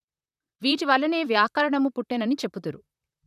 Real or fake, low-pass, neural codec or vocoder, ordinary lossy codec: fake; 14.4 kHz; vocoder, 44.1 kHz, 128 mel bands, Pupu-Vocoder; none